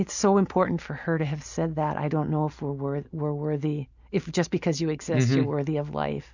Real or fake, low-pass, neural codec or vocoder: real; 7.2 kHz; none